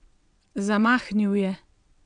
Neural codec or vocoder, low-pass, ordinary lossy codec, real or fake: vocoder, 22.05 kHz, 80 mel bands, WaveNeXt; 9.9 kHz; none; fake